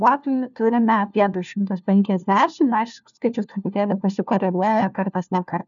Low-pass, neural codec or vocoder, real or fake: 7.2 kHz; codec, 16 kHz, 1 kbps, FunCodec, trained on LibriTTS, 50 frames a second; fake